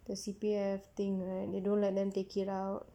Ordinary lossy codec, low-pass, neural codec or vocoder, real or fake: none; 19.8 kHz; none; real